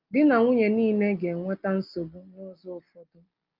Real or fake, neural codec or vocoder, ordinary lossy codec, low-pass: real; none; Opus, 24 kbps; 5.4 kHz